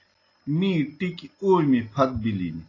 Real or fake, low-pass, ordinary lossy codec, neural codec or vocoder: real; 7.2 kHz; AAC, 32 kbps; none